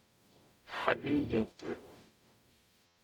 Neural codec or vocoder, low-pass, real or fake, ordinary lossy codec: codec, 44.1 kHz, 0.9 kbps, DAC; 19.8 kHz; fake; none